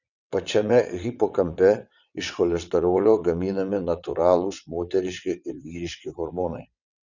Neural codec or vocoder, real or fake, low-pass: vocoder, 22.05 kHz, 80 mel bands, WaveNeXt; fake; 7.2 kHz